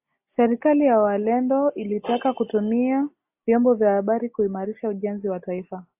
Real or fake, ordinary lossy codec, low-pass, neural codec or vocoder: real; AAC, 32 kbps; 3.6 kHz; none